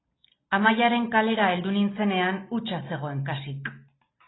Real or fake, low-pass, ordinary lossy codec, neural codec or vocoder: real; 7.2 kHz; AAC, 16 kbps; none